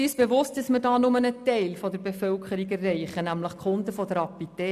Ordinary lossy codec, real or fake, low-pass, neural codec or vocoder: none; real; 14.4 kHz; none